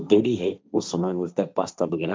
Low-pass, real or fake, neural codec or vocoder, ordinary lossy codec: none; fake; codec, 16 kHz, 1.1 kbps, Voila-Tokenizer; none